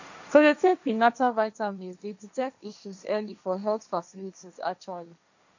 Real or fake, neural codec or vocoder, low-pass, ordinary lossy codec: fake; codec, 16 kHz, 1.1 kbps, Voila-Tokenizer; 7.2 kHz; none